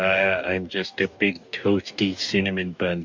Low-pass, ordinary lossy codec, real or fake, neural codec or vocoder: 7.2 kHz; MP3, 48 kbps; fake; codec, 44.1 kHz, 2.6 kbps, DAC